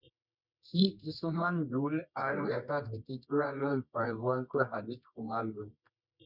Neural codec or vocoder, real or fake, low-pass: codec, 24 kHz, 0.9 kbps, WavTokenizer, medium music audio release; fake; 5.4 kHz